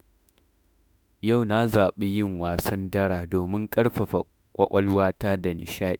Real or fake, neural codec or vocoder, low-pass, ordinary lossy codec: fake; autoencoder, 48 kHz, 32 numbers a frame, DAC-VAE, trained on Japanese speech; none; none